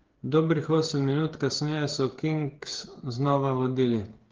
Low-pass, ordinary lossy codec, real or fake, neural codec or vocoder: 7.2 kHz; Opus, 16 kbps; fake; codec, 16 kHz, 8 kbps, FreqCodec, smaller model